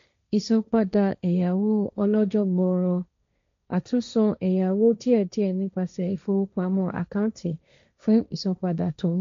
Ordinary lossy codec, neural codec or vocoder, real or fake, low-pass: MP3, 64 kbps; codec, 16 kHz, 1.1 kbps, Voila-Tokenizer; fake; 7.2 kHz